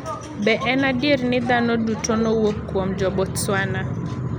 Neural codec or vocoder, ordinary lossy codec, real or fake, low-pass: none; none; real; 19.8 kHz